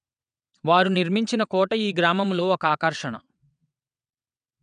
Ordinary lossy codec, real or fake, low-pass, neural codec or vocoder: none; fake; 9.9 kHz; vocoder, 22.05 kHz, 80 mel bands, WaveNeXt